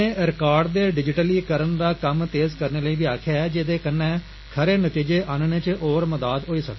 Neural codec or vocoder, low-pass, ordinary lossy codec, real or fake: none; 7.2 kHz; MP3, 24 kbps; real